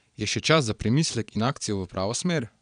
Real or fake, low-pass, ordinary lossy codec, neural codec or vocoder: fake; 9.9 kHz; none; vocoder, 22.05 kHz, 80 mel bands, Vocos